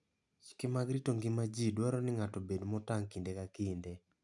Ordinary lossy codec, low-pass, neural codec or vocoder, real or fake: none; 10.8 kHz; none; real